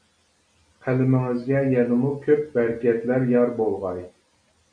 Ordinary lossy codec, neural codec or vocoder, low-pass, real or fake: MP3, 64 kbps; none; 9.9 kHz; real